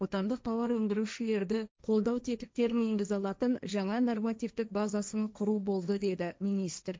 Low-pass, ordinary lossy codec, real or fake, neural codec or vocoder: none; none; fake; codec, 16 kHz, 1.1 kbps, Voila-Tokenizer